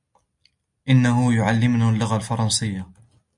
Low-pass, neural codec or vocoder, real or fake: 10.8 kHz; none; real